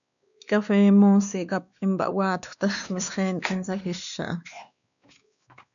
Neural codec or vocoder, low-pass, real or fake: codec, 16 kHz, 2 kbps, X-Codec, WavLM features, trained on Multilingual LibriSpeech; 7.2 kHz; fake